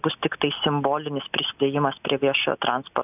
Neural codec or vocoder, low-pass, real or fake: none; 3.6 kHz; real